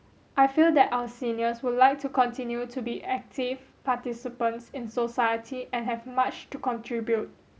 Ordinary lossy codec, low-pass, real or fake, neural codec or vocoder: none; none; real; none